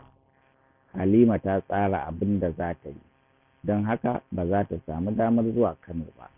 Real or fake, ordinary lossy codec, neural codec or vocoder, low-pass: real; none; none; 3.6 kHz